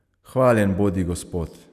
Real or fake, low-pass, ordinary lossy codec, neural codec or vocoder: real; 14.4 kHz; none; none